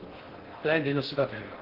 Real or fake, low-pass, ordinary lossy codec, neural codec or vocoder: fake; 5.4 kHz; Opus, 16 kbps; codec, 16 kHz in and 24 kHz out, 0.6 kbps, FocalCodec, streaming, 4096 codes